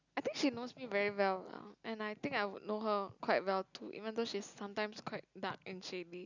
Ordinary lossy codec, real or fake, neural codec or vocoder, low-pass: none; real; none; 7.2 kHz